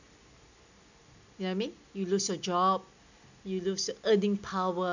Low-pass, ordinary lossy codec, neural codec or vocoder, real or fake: 7.2 kHz; none; none; real